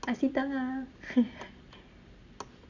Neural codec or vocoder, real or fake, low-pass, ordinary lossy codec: none; real; 7.2 kHz; Opus, 64 kbps